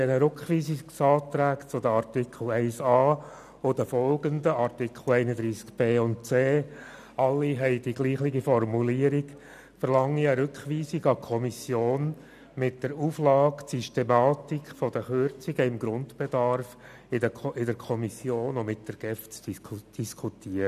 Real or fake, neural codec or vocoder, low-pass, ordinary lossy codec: real; none; 14.4 kHz; none